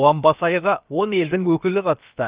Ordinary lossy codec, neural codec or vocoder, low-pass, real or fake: Opus, 32 kbps; codec, 16 kHz, about 1 kbps, DyCAST, with the encoder's durations; 3.6 kHz; fake